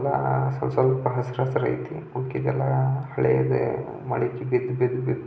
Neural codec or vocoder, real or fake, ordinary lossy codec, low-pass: none; real; none; none